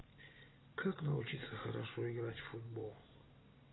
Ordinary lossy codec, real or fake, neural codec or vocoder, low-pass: AAC, 16 kbps; real; none; 7.2 kHz